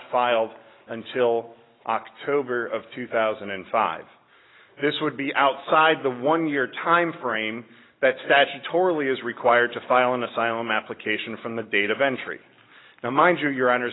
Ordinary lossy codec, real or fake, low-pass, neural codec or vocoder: AAC, 16 kbps; real; 7.2 kHz; none